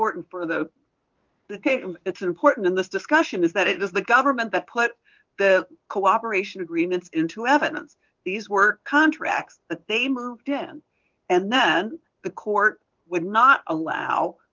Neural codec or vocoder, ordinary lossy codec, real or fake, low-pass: codec, 16 kHz in and 24 kHz out, 1 kbps, XY-Tokenizer; Opus, 24 kbps; fake; 7.2 kHz